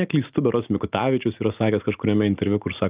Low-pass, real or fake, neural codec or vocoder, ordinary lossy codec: 3.6 kHz; real; none; Opus, 24 kbps